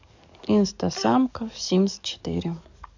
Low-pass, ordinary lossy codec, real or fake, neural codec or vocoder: 7.2 kHz; none; fake; codec, 44.1 kHz, 7.8 kbps, DAC